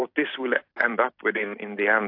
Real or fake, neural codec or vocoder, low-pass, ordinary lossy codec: fake; vocoder, 44.1 kHz, 128 mel bands every 256 samples, BigVGAN v2; 5.4 kHz; AAC, 32 kbps